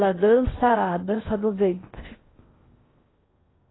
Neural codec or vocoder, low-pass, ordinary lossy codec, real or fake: codec, 16 kHz in and 24 kHz out, 0.6 kbps, FocalCodec, streaming, 2048 codes; 7.2 kHz; AAC, 16 kbps; fake